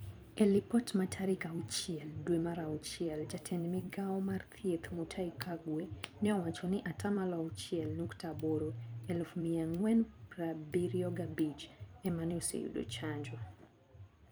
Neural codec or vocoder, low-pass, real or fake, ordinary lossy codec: vocoder, 44.1 kHz, 128 mel bands every 256 samples, BigVGAN v2; none; fake; none